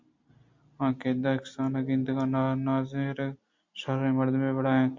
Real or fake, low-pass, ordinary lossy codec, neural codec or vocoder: real; 7.2 kHz; MP3, 48 kbps; none